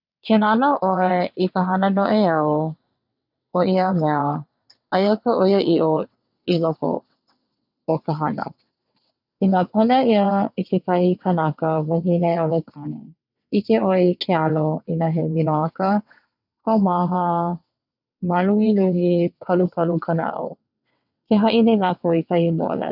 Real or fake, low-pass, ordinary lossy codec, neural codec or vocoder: fake; 5.4 kHz; none; vocoder, 22.05 kHz, 80 mel bands, WaveNeXt